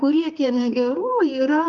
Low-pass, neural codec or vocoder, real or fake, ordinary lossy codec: 7.2 kHz; codec, 16 kHz, 2 kbps, X-Codec, HuBERT features, trained on balanced general audio; fake; Opus, 24 kbps